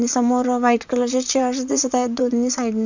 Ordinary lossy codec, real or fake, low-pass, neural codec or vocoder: none; fake; 7.2 kHz; vocoder, 44.1 kHz, 128 mel bands, Pupu-Vocoder